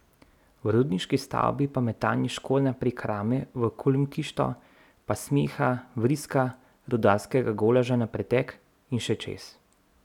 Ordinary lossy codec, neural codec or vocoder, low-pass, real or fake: none; none; 19.8 kHz; real